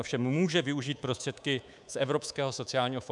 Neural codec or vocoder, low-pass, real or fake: codec, 24 kHz, 3.1 kbps, DualCodec; 10.8 kHz; fake